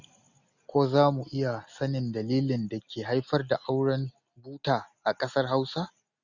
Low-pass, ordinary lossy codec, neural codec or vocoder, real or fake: 7.2 kHz; none; none; real